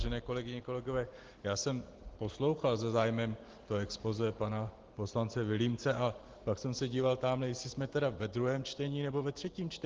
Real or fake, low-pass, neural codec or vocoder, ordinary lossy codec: real; 7.2 kHz; none; Opus, 16 kbps